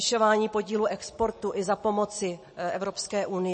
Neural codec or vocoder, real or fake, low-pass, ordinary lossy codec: none; real; 9.9 kHz; MP3, 32 kbps